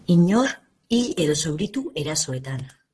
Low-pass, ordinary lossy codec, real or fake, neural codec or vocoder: 10.8 kHz; Opus, 16 kbps; fake; vocoder, 44.1 kHz, 128 mel bands, Pupu-Vocoder